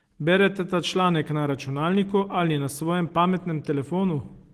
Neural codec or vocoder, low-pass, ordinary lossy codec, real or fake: none; 14.4 kHz; Opus, 16 kbps; real